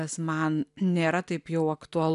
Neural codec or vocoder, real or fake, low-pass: none; real; 10.8 kHz